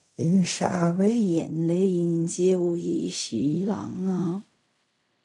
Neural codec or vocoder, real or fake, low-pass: codec, 16 kHz in and 24 kHz out, 0.4 kbps, LongCat-Audio-Codec, fine tuned four codebook decoder; fake; 10.8 kHz